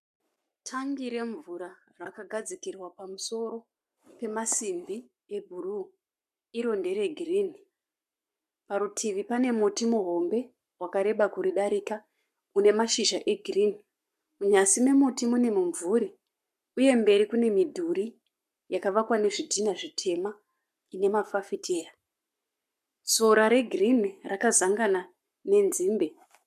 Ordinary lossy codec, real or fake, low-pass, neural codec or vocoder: MP3, 96 kbps; fake; 14.4 kHz; codec, 44.1 kHz, 7.8 kbps, Pupu-Codec